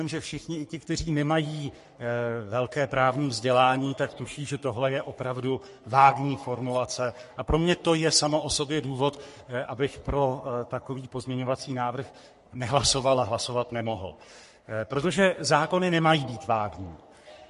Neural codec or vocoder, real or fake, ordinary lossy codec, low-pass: codec, 44.1 kHz, 3.4 kbps, Pupu-Codec; fake; MP3, 48 kbps; 14.4 kHz